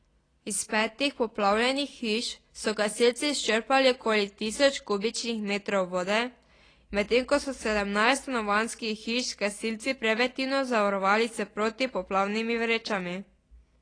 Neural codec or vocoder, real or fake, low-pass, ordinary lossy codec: none; real; 9.9 kHz; AAC, 32 kbps